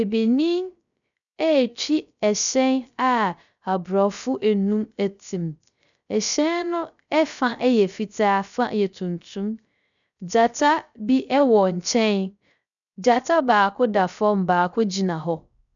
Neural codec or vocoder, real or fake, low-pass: codec, 16 kHz, 0.3 kbps, FocalCodec; fake; 7.2 kHz